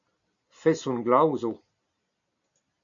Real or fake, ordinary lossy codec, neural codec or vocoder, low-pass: real; MP3, 64 kbps; none; 7.2 kHz